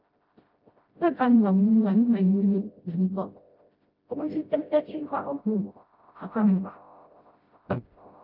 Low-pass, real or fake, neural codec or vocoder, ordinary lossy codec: 5.4 kHz; fake; codec, 16 kHz, 0.5 kbps, FreqCodec, smaller model; Opus, 24 kbps